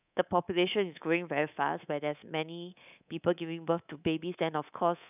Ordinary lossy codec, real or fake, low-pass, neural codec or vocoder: none; fake; 3.6 kHz; codec, 24 kHz, 3.1 kbps, DualCodec